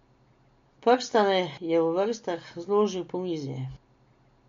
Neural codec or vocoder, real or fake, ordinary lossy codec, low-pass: none; real; MP3, 48 kbps; 7.2 kHz